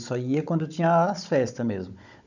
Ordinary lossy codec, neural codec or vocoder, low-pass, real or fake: none; codec, 16 kHz, 16 kbps, FunCodec, trained on Chinese and English, 50 frames a second; 7.2 kHz; fake